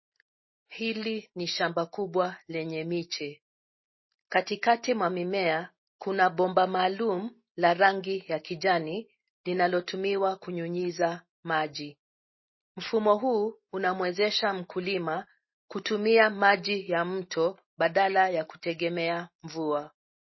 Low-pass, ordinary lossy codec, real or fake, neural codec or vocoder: 7.2 kHz; MP3, 24 kbps; real; none